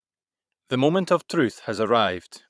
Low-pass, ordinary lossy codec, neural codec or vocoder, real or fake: none; none; vocoder, 22.05 kHz, 80 mel bands, Vocos; fake